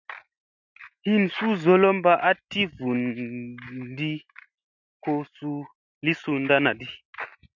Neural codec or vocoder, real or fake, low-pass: none; real; 7.2 kHz